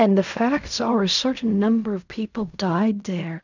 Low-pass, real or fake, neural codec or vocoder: 7.2 kHz; fake; codec, 16 kHz in and 24 kHz out, 0.4 kbps, LongCat-Audio-Codec, fine tuned four codebook decoder